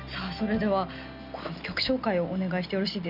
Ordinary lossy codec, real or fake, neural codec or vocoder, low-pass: none; real; none; 5.4 kHz